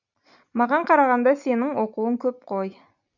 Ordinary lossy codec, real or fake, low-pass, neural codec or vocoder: none; real; 7.2 kHz; none